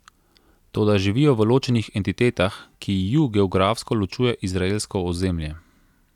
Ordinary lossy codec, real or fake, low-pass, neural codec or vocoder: none; real; 19.8 kHz; none